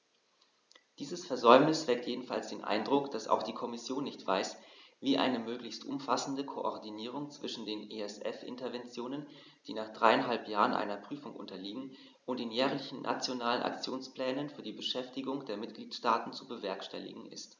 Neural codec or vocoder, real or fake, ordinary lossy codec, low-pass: none; real; none; 7.2 kHz